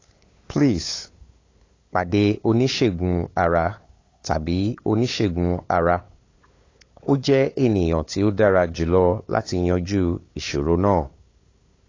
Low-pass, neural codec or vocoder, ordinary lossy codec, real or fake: 7.2 kHz; codec, 16 kHz, 8 kbps, FunCodec, trained on LibriTTS, 25 frames a second; AAC, 32 kbps; fake